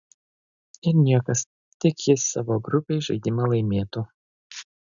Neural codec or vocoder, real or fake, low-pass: none; real; 7.2 kHz